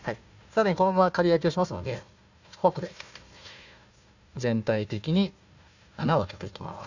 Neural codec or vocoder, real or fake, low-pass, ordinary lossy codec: codec, 16 kHz, 1 kbps, FunCodec, trained on Chinese and English, 50 frames a second; fake; 7.2 kHz; none